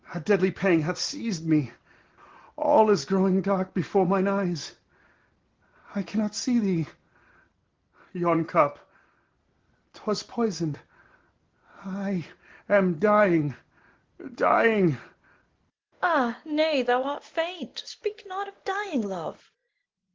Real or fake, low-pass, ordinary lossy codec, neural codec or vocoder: real; 7.2 kHz; Opus, 16 kbps; none